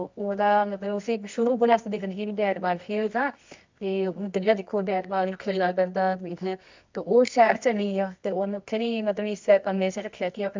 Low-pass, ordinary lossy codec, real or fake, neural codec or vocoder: 7.2 kHz; MP3, 64 kbps; fake; codec, 24 kHz, 0.9 kbps, WavTokenizer, medium music audio release